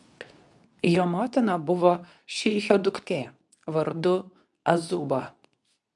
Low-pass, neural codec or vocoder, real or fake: 10.8 kHz; codec, 24 kHz, 0.9 kbps, WavTokenizer, medium speech release version 2; fake